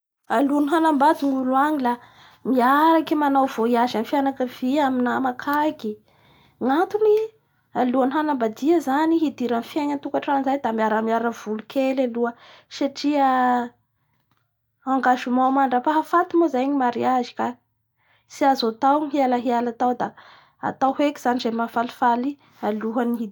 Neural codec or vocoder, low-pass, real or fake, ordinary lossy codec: none; none; real; none